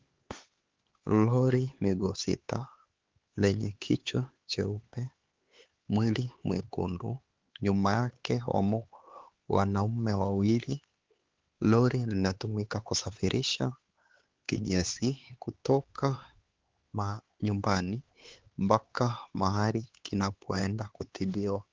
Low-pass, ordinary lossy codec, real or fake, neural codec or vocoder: 7.2 kHz; Opus, 16 kbps; fake; codec, 16 kHz, 4 kbps, X-Codec, HuBERT features, trained on LibriSpeech